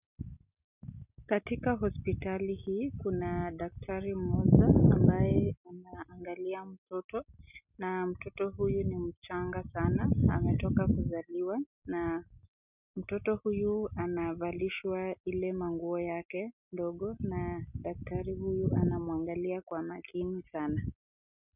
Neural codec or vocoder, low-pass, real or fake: none; 3.6 kHz; real